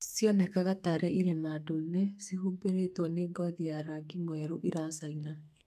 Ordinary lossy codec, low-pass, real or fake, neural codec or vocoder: none; 14.4 kHz; fake; codec, 32 kHz, 1.9 kbps, SNAC